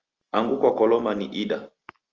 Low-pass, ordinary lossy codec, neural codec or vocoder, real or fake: 7.2 kHz; Opus, 24 kbps; none; real